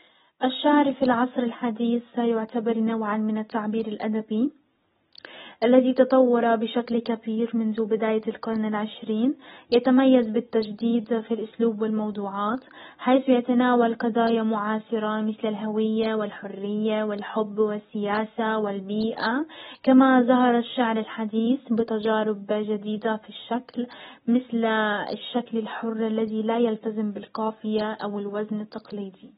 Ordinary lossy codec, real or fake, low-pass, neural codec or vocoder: AAC, 16 kbps; real; 19.8 kHz; none